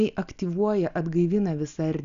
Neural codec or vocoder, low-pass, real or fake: none; 7.2 kHz; real